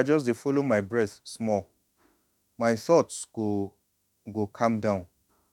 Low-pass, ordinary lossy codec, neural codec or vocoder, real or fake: 19.8 kHz; none; autoencoder, 48 kHz, 32 numbers a frame, DAC-VAE, trained on Japanese speech; fake